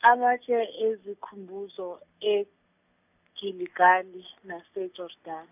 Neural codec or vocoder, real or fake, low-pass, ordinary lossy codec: none; real; 3.6 kHz; none